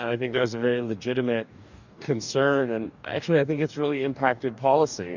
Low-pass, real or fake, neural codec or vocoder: 7.2 kHz; fake; codec, 44.1 kHz, 2.6 kbps, DAC